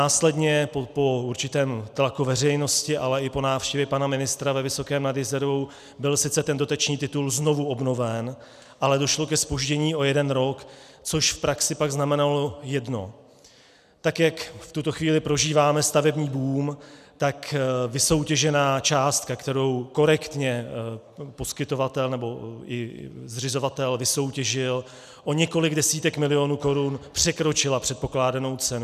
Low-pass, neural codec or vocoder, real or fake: 14.4 kHz; none; real